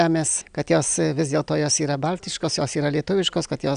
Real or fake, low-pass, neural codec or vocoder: real; 9.9 kHz; none